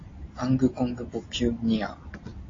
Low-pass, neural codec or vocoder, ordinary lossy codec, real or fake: 7.2 kHz; none; AAC, 32 kbps; real